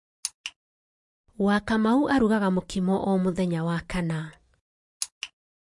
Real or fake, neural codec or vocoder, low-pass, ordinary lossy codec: real; none; 10.8 kHz; MP3, 48 kbps